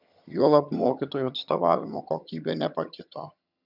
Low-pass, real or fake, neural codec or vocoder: 5.4 kHz; fake; vocoder, 22.05 kHz, 80 mel bands, HiFi-GAN